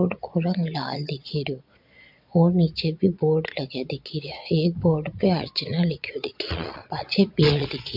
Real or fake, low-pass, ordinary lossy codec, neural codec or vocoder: fake; 5.4 kHz; MP3, 48 kbps; vocoder, 22.05 kHz, 80 mel bands, Vocos